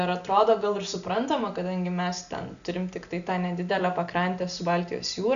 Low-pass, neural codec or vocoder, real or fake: 7.2 kHz; none; real